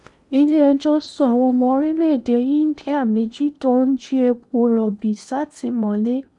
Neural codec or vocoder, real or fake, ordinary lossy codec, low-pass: codec, 16 kHz in and 24 kHz out, 0.8 kbps, FocalCodec, streaming, 65536 codes; fake; none; 10.8 kHz